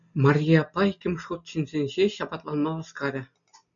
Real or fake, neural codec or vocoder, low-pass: real; none; 7.2 kHz